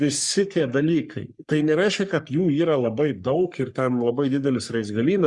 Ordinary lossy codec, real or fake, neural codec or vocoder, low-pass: Opus, 64 kbps; fake; codec, 44.1 kHz, 3.4 kbps, Pupu-Codec; 10.8 kHz